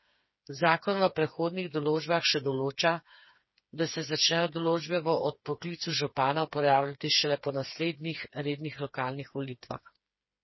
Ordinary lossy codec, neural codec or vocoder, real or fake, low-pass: MP3, 24 kbps; codec, 16 kHz, 4 kbps, FreqCodec, smaller model; fake; 7.2 kHz